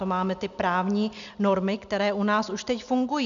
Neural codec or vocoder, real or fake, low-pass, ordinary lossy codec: none; real; 7.2 kHz; MP3, 96 kbps